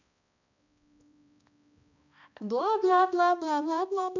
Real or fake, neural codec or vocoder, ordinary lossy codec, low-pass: fake; codec, 16 kHz, 1 kbps, X-Codec, HuBERT features, trained on balanced general audio; none; 7.2 kHz